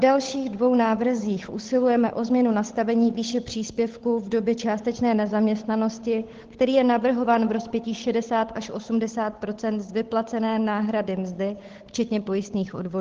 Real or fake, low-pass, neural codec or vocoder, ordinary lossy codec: fake; 7.2 kHz; codec, 16 kHz, 8 kbps, FunCodec, trained on Chinese and English, 25 frames a second; Opus, 16 kbps